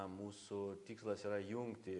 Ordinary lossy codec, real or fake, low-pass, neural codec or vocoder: AAC, 64 kbps; real; 10.8 kHz; none